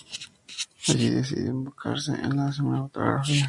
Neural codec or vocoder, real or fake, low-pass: none; real; 10.8 kHz